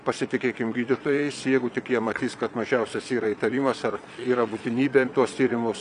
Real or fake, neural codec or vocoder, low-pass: fake; vocoder, 22.05 kHz, 80 mel bands, Vocos; 9.9 kHz